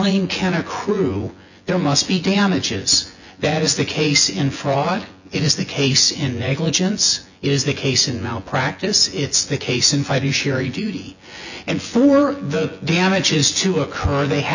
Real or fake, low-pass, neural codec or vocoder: fake; 7.2 kHz; vocoder, 24 kHz, 100 mel bands, Vocos